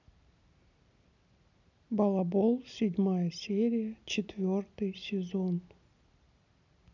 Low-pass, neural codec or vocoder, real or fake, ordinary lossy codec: 7.2 kHz; none; real; none